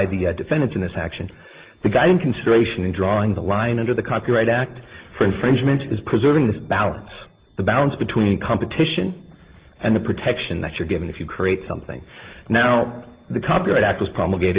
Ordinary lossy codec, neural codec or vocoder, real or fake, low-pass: Opus, 16 kbps; none; real; 3.6 kHz